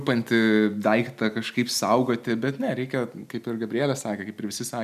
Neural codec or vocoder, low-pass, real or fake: none; 14.4 kHz; real